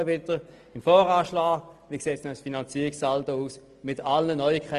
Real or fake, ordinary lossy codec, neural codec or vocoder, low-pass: real; Opus, 24 kbps; none; 10.8 kHz